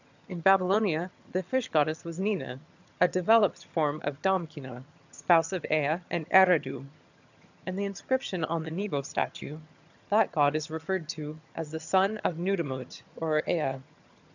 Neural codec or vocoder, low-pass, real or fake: vocoder, 22.05 kHz, 80 mel bands, HiFi-GAN; 7.2 kHz; fake